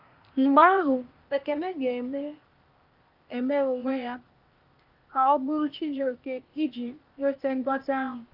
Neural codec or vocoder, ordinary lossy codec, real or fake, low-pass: codec, 16 kHz, 0.8 kbps, ZipCodec; Opus, 24 kbps; fake; 5.4 kHz